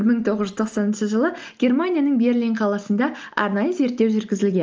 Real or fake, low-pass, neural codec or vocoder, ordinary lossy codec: real; 7.2 kHz; none; Opus, 24 kbps